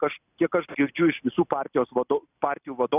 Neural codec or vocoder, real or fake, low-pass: vocoder, 44.1 kHz, 128 mel bands every 256 samples, BigVGAN v2; fake; 3.6 kHz